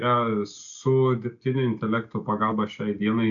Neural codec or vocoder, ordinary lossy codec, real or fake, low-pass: none; AAC, 64 kbps; real; 7.2 kHz